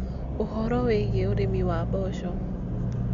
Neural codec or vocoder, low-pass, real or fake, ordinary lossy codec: none; 7.2 kHz; real; none